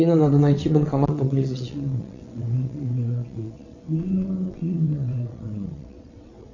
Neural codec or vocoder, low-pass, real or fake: vocoder, 22.05 kHz, 80 mel bands, WaveNeXt; 7.2 kHz; fake